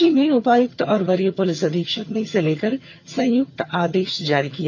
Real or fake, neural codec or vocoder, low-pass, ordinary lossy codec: fake; vocoder, 22.05 kHz, 80 mel bands, HiFi-GAN; 7.2 kHz; none